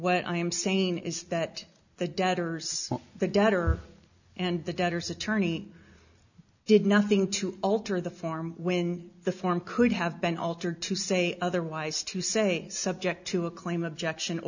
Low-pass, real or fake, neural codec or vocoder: 7.2 kHz; real; none